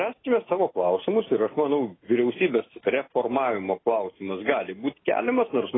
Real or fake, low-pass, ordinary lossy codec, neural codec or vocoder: real; 7.2 kHz; AAC, 16 kbps; none